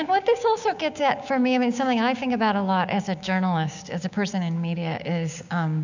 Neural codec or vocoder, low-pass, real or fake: codec, 16 kHz, 6 kbps, DAC; 7.2 kHz; fake